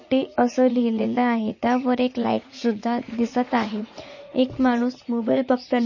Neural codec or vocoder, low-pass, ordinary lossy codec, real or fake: vocoder, 44.1 kHz, 80 mel bands, Vocos; 7.2 kHz; MP3, 32 kbps; fake